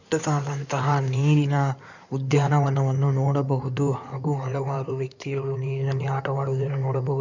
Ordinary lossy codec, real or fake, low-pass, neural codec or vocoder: none; fake; 7.2 kHz; codec, 16 kHz in and 24 kHz out, 2.2 kbps, FireRedTTS-2 codec